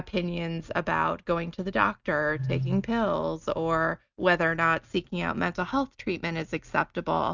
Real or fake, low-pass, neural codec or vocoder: real; 7.2 kHz; none